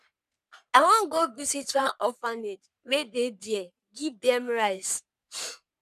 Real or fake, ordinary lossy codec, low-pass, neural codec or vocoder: fake; none; 14.4 kHz; codec, 44.1 kHz, 3.4 kbps, Pupu-Codec